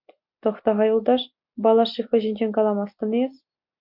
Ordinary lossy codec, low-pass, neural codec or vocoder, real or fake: MP3, 48 kbps; 5.4 kHz; none; real